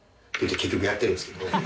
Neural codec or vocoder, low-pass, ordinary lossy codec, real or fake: none; none; none; real